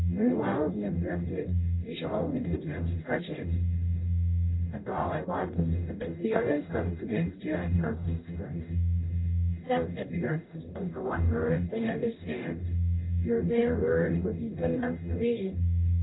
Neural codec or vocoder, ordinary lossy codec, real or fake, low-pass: codec, 44.1 kHz, 0.9 kbps, DAC; AAC, 16 kbps; fake; 7.2 kHz